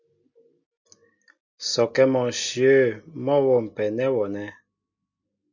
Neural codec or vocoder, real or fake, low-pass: none; real; 7.2 kHz